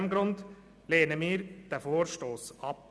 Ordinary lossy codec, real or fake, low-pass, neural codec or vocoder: none; real; none; none